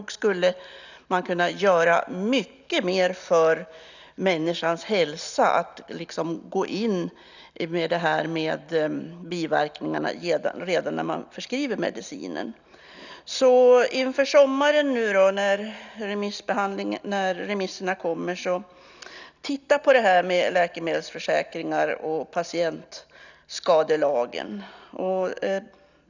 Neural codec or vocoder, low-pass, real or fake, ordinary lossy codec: none; 7.2 kHz; real; none